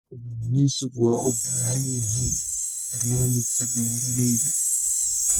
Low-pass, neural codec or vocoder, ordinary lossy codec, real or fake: none; codec, 44.1 kHz, 1.7 kbps, Pupu-Codec; none; fake